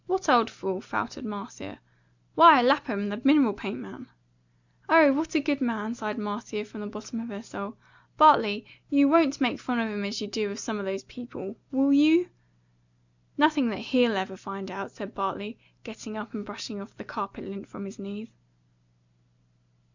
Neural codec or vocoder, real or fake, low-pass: none; real; 7.2 kHz